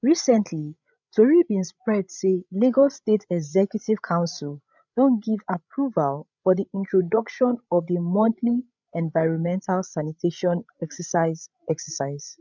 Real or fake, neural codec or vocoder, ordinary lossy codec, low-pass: fake; codec, 16 kHz, 16 kbps, FreqCodec, larger model; none; 7.2 kHz